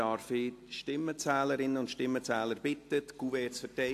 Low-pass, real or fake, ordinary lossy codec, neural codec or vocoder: 14.4 kHz; real; AAC, 64 kbps; none